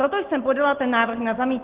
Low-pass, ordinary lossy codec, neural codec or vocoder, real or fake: 3.6 kHz; Opus, 16 kbps; none; real